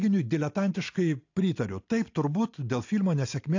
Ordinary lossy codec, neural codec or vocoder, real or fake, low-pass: AAC, 48 kbps; none; real; 7.2 kHz